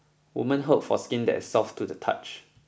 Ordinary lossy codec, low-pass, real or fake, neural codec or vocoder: none; none; real; none